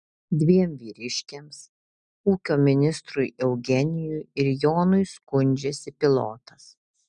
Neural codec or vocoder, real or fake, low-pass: none; real; 9.9 kHz